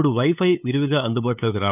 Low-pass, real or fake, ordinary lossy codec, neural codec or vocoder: 3.6 kHz; fake; none; codec, 16 kHz, 8 kbps, FunCodec, trained on LibriTTS, 25 frames a second